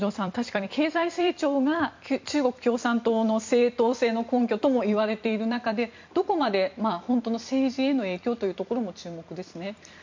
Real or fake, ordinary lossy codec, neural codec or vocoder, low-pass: fake; MP3, 64 kbps; vocoder, 44.1 kHz, 128 mel bands every 256 samples, BigVGAN v2; 7.2 kHz